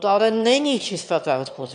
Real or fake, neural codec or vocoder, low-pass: fake; autoencoder, 22.05 kHz, a latent of 192 numbers a frame, VITS, trained on one speaker; 9.9 kHz